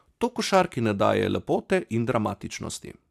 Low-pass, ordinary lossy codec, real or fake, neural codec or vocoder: 14.4 kHz; AAC, 96 kbps; fake; vocoder, 44.1 kHz, 128 mel bands every 512 samples, BigVGAN v2